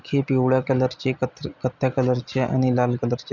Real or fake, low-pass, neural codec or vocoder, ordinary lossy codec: real; 7.2 kHz; none; none